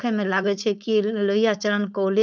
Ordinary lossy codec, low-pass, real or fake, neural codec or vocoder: none; none; fake; codec, 16 kHz, 4.8 kbps, FACodec